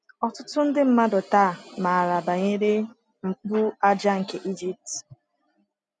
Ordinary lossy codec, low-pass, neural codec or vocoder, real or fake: none; 9.9 kHz; none; real